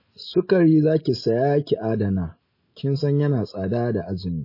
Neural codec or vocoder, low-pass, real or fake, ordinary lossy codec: none; 5.4 kHz; real; MP3, 24 kbps